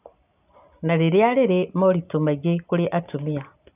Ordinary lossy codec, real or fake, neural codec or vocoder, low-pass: none; real; none; 3.6 kHz